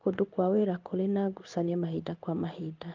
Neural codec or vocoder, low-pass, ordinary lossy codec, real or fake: codec, 16 kHz in and 24 kHz out, 1 kbps, XY-Tokenizer; 7.2 kHz; Opus, 24 kbps; fake